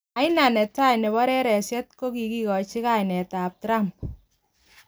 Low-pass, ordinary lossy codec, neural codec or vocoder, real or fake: none; none; none; real